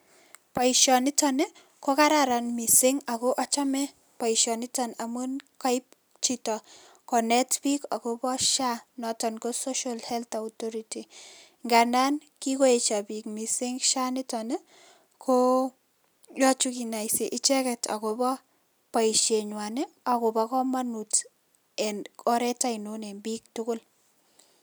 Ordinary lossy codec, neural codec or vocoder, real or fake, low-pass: none; none; real; none